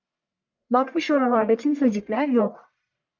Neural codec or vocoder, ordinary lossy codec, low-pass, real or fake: codec, 44.1 kHz, 1.7 kbps, Pupu-Codec; MP3, 64 kbps; 7.2 kHz; fake